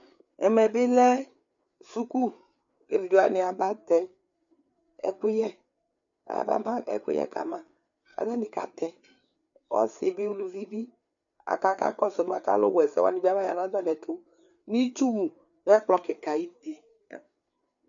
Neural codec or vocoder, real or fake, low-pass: codec, 16 kHz, 4 kbps, FreqCodec, larger model; fake; 7.2 kHz